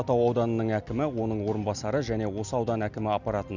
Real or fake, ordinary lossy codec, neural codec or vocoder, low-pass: real; none; none; 7.2 kHz